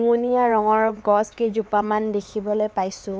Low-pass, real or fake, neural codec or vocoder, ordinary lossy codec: none; fake; codec, 16 kHz, 4 kbps, X-Codec, HuBERT features, trained on LibriSpeech; none